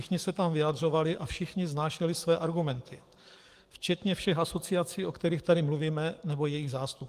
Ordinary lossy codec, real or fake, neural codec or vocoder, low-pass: Opus, 24 kbps; fake; codec, 44.1 kHz, 7.8 kbps, DAC; 14.4 kHz